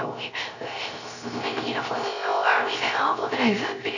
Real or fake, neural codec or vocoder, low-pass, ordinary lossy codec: fake; codec, 16 kHz, 0.3 kbps, FocalCodec; 7.2 kHz; none